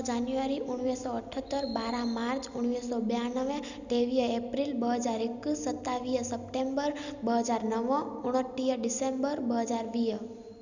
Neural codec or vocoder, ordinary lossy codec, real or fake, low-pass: none; none; real; 7.2 kHz